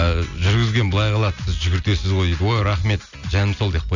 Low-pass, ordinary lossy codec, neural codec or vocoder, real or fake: 7.2 kHz; none; none; real